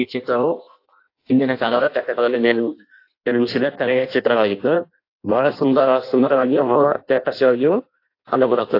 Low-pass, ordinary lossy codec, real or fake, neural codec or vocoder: 5.4 kHz; AAC, 32 kbps; fake; codec, 16 kHz in and 24 kHz out, 0.6 kbps, FireRedTTS-2 codec